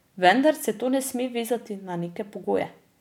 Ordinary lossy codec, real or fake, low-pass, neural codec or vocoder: none; real; 19.8 kHz; none